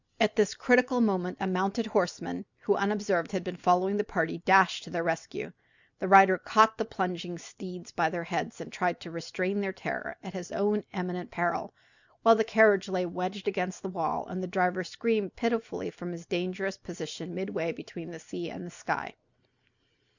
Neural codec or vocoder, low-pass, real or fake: none; 7.2 kHz; real